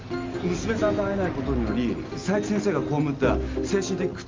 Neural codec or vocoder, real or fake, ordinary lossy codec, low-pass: none; real; Opus, 32 kbps; 7.2 kHz